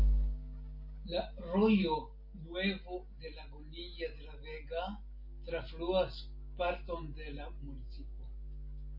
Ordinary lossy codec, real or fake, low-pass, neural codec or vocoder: MP3, 32 kbps; real; 5.4 kHz; none